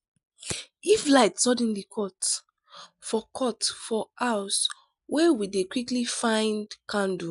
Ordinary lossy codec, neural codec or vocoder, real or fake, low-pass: none; none; real; 10.8 kHz